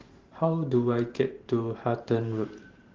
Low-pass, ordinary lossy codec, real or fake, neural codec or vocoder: 7.2 kHz; Opus, 16 kbps; fake; vocoder, 44.1 kHz, 128 mel bands every 512 samples, BigVGAN v2